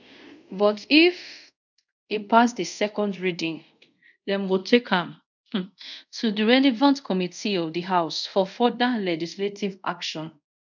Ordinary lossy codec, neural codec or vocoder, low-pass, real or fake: none; codec, 24 kHz, 0.5 kbps, DualCodec; 7.2 kHz; fake